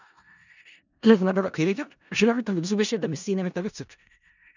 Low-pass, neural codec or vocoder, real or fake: 7.2 kHz; codec, 16 kHz in and 24 kHz out, 0.4 kbps, LongCat-Audio-Codec, four codebook decoder; fake